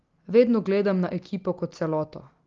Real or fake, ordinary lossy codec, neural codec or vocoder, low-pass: real; Opus, 32 kbps; none; 7.2 kHz